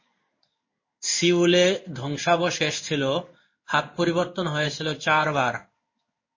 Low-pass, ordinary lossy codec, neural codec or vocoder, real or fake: 7.2 kHz; MP3, 32 kbps; codec, 16 kHz in and 24 kHz out, 1 kbps, XY-Tokenizer; fake